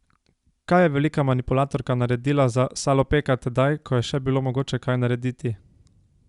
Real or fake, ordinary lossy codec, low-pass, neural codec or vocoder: real; Opus, 64 kbps; 10.8 kHz; none